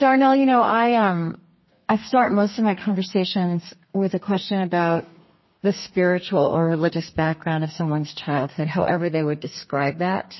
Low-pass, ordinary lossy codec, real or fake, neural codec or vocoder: 7.2 kHz; MP3, 24 kbps; fake; codec, 32 kHz, 1.9 kbps, SNAC